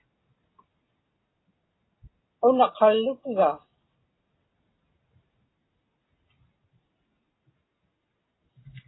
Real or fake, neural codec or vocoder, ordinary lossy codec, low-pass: real; none; AAC, 16 kbps; 7.2 kHz